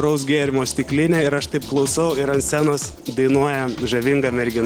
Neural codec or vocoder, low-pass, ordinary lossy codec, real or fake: none; 19.8 kHz; Opus, 16 kbps; real